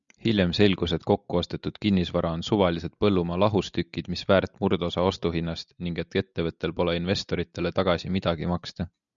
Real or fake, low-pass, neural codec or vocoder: real; 7.2 kHz; none